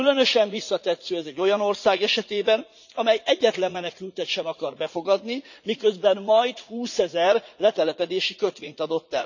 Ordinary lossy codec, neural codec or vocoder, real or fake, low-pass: none; vocoder, 44.1 kHz, 80 mel bands, Vocos; fake; 7.2 kHz